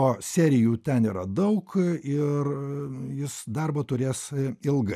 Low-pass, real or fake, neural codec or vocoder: 14.4 kHz; real; none